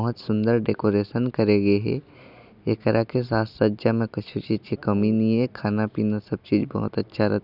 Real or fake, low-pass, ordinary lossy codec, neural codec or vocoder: real; 5.4 kHz; none; none